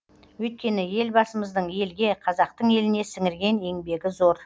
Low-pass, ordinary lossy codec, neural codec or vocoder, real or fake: none; none; none; real